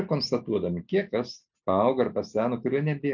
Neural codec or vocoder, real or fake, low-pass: none; real; 7.2 kHz